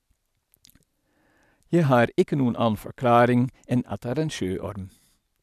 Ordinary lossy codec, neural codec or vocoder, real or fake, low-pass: none; none; real; 14.4 kHz